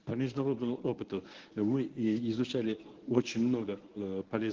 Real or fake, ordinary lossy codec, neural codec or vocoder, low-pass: fake; Opus, 16 kbps; codec, 24 kHz, 0.9 kbps, WavTokenizer, medium speech release version 1; 7.2 kHz